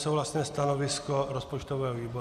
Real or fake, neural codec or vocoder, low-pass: real; none; 14.4 kHz